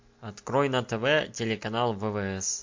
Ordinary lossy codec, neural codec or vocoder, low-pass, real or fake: MP3, 48 kbps; none; 7.2 kHz; real